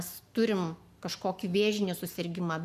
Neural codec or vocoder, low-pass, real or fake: codec, 44.1 kHz, 7.8 kbps, Pupu-Codec; 14.4 kHz; fake